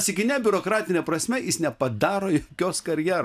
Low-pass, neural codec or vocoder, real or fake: 14.4 kHz; none; real